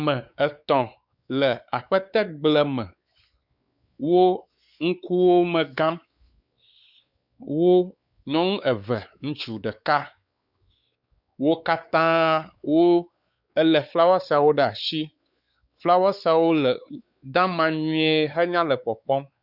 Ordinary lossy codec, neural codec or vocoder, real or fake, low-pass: Opus, 64 kbps; codec, 16 kHz, 4 kbps, X-Codec, WavLM features, trained on Multilingual LibriSpeech; fake; 5.4 kHz